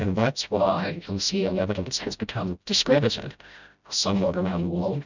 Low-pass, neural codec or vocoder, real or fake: 7.2 kHz; codec, 16 kHz, 0.5 kbps, FreqCodec, smaller model; fake